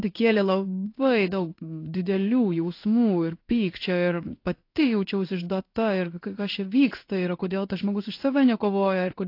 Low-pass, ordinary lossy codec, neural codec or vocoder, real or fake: 5.4 kHz; AAC, 32 kbps; codec, 16 kHz in and 24 kHz out, 1 kbps, XY-Tokenizer; fake